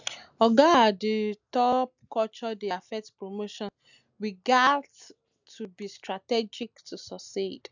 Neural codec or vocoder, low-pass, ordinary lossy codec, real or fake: none; 7.2 kHz; none; real